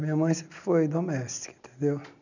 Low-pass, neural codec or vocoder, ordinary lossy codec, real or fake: 7.2 kHz; none; none; real